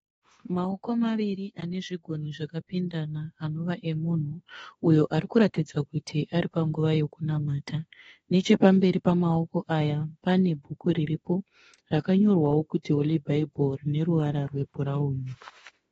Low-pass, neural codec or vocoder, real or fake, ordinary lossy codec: 19.8 kHz; autoencoder, 48 kHz, 32 numbers a frame, DAC-VAE, trained on Japanese speech; fake; AAC, 24 kbps